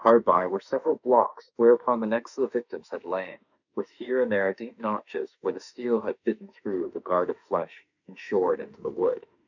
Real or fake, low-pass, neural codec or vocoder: fake; 7.2 kHz; autoencoder, 48 kHz, 32 numbers a frame, DAC-VAE, trained on Japanese speech